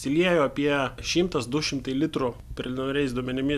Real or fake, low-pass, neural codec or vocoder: real; 14.4 kHz; none